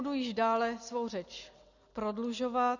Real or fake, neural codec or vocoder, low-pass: real; none; 7.2 kHz